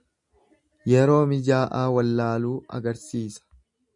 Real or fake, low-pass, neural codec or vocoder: real; 10.8 kHz; none